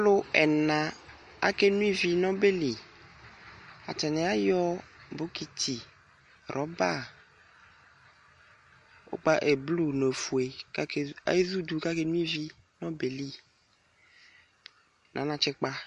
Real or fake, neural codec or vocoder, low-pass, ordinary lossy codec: real; none; 14.4 kHz; MP3, 48 kbps